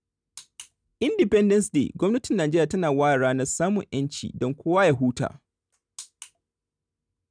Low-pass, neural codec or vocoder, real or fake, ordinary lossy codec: 9.9 kHz; none; real; none